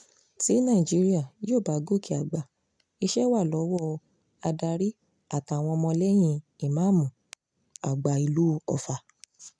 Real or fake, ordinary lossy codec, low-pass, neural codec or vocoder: real; none; 9.9 kHz; none